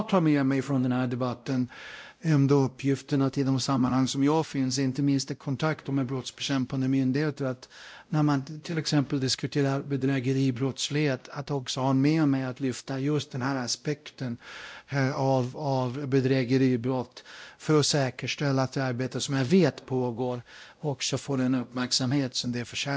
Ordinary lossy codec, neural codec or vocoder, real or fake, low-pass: none; codec, 16 kHz, 0.5 kbps, X-Codec, WavLM features, trained on Multilingual LibriSpeech; fake; none